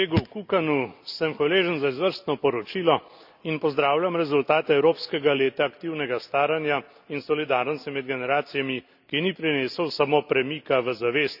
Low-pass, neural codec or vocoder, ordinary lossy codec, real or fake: 5.4 kHz; none; none; real